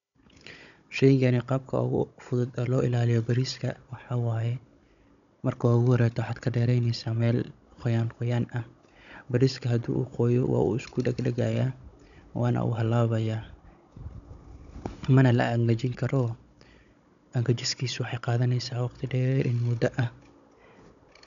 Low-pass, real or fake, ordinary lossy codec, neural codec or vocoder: 7.2 kHz; fake; none; codec, 16 kHz, 16 kbps, FunCodec, trained on Chinese and English, 50 frames a second